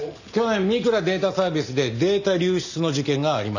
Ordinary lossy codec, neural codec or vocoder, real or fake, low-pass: none; none; real; 7.2 kHz